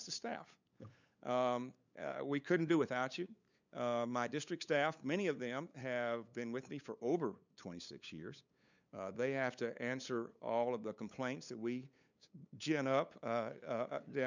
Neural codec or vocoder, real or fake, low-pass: codec, 16 kHz, 8 kbps, FunCodec, trained on LibriTTS, 25 frames a second; fake; 7.2 kHz